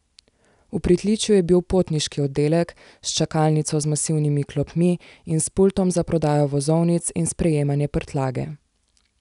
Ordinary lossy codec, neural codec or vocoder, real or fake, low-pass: none; none; real; 10.8 kHz